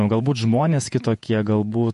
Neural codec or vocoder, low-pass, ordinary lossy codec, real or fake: vocoder, 48 kHz, 128 mel bands, Vocos; 14.4 kHz; MP3, 48 kbps; fake